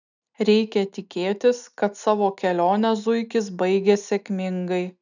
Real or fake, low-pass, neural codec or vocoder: real; 7.2 kHz; none